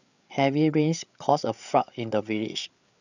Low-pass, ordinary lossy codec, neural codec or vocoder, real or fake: 7.2 kHz; none; codec, 16 kHz, 8 kbps, FreqCodec, larger model; fake